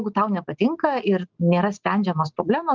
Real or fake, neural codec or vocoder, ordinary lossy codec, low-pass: real; none; Opus, 24 kbps; 7.2 kHz